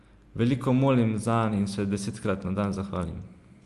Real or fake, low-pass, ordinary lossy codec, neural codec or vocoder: real; 10.8 kHz; Opus, 32 kbps; none